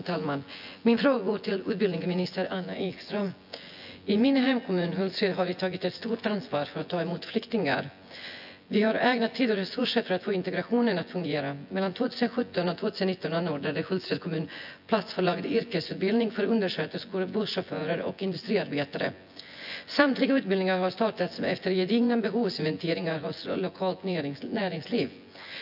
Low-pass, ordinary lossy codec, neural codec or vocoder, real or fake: 5.4 kHz; AAC, 48 kbps; vocoder, 24 kHz, 100 mel bands, Vocos; fake